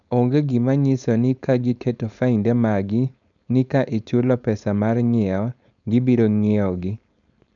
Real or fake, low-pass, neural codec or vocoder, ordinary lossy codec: fake; 7.2 kHz; codec, 16 kHz, 4.8 kbps, FACodec; none